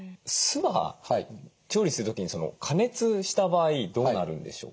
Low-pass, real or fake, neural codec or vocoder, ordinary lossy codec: none; real; none; none